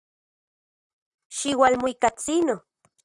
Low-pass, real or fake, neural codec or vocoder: 10.8 kHz; fake; vocoder, 44.1 kHz, 128 mel bands, Pupu-Vocoder